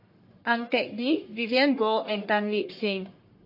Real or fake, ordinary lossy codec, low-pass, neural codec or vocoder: fake; MP3, 32 kbps; 5.4 kHz; codec, 44.1 kHz, 1.7 kbps, Pupu-Codec